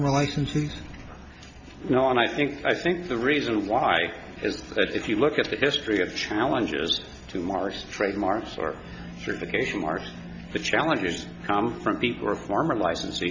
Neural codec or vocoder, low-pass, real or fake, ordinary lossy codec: none; 7.2 kHz; real; MP3, 64 kbps